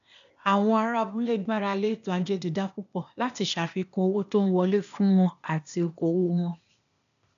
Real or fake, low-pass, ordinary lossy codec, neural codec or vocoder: fake; 7.2 kHz; none; codec, 16 kHz, 0.8 kbps, ZipCodec